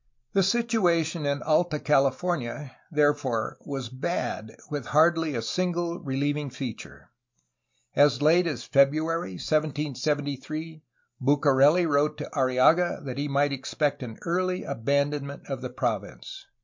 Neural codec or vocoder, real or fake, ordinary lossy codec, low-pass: none; real; MP3, 48 kbps; 7.2 kHz